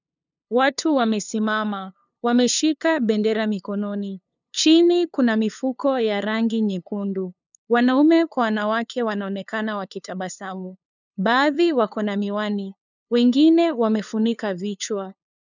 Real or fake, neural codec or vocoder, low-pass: fake; codec, 16 kHz, 2 kbps, FunCodec, trained on LibriTTS, 25 frames a second; 7.2 kHz